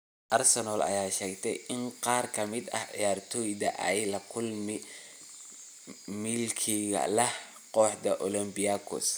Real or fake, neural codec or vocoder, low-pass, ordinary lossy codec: real; none; none; none